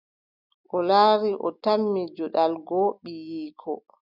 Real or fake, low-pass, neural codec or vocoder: real; 5.4 kHz; none